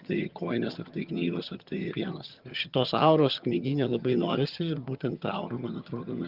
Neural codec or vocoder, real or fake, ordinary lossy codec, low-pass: vocoder, 22.05 kHz, 80 mel bands, HiFi-GAN; fake; Opus, 32 kbps; 5.4 kHz